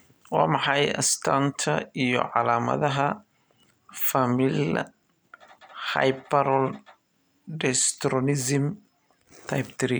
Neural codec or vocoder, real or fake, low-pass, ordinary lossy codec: none; real; none; none